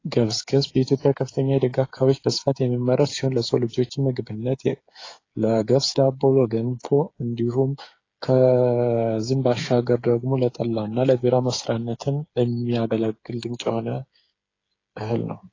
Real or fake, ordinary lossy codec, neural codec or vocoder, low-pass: fake; AAC, 32 kbps; codec, 16 kHz, 8 kbps, FreqCodec, smaller model; 7.2 kHz